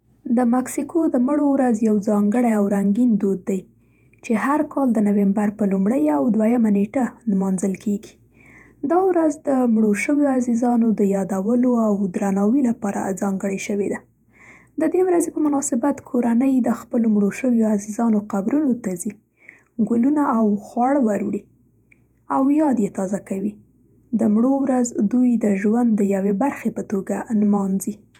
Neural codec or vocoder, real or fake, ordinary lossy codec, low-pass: vocoder, 48 kHz, 128 mel bands, Vocos; fake; none; 19.8 kHz